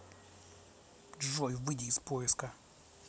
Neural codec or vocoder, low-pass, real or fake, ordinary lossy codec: none; none; real; none